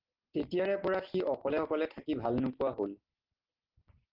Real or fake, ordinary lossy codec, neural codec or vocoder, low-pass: real; Opus, 16 kbps; none; 5.4 kHz